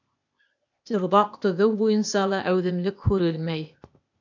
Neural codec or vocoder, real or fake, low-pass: codec, 16 kHz, 0.8 kbps, ZipCodec; fake; 7.2 kHz